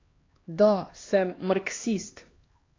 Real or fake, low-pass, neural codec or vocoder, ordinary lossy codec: fake; 7.2 kHz; codec, 16 kHz, 2 kbps, X-Codec, HuBERT features, trained on LibriSpeech; AAC, 32 kbps